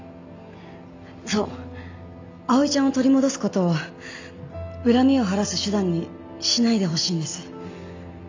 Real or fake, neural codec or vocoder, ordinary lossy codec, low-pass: real; none; AAC, 48 kbps; 7.2 kHz